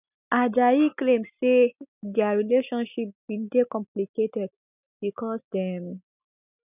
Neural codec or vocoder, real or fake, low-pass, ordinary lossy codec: none; real; 3.6 kHz; none